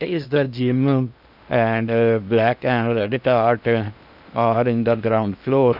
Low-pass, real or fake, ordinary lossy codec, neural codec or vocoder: 5.4 kHz; fake; none; codec, 16 kHz in and 24 kHz out, 0.6 kbps, FocalCodec, streaming, 2048 codes